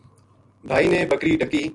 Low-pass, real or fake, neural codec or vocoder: 10.8 kHz; real; none